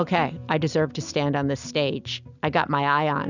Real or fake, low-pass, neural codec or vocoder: real; 7.2 kHz; none